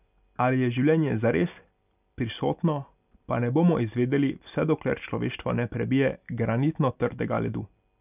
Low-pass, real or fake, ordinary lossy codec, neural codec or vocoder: 3.6 kHz; real; none; none